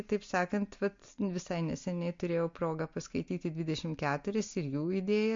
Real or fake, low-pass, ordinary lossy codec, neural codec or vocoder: real; 7.2 kHz; MP3, 48 kbps; none